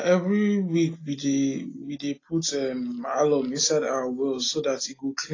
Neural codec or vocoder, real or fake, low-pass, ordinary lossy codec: none; real; 7.2 kHz; AAC, 32 kbps